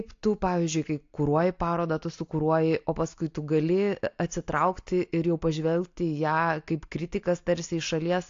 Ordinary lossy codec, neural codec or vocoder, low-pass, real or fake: AAC, 48 kbps; none; 7.2 kHz; real